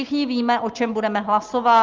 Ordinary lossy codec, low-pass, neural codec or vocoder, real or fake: Opus, 32 kbps; 7.2 kHz; none; real